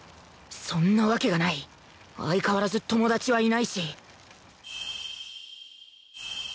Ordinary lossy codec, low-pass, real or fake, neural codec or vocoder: none; none; real; none